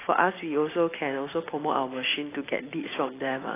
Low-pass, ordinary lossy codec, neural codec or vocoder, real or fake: 3.6 kHz; AAC, 16 kbps; none; real